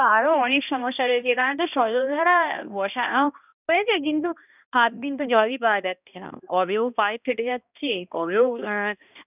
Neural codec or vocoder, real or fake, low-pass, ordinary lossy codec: codec, 16 kHz, 1 kbps, X-Codec, HuBERT features, trained on balanced general audio; fake; 3.6 kHz; none